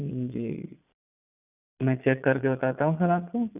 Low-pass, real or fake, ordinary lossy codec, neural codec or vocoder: 3.6 kHz; fake; none; vocoder, 22.05 kHz, 80 mel bands, Vocos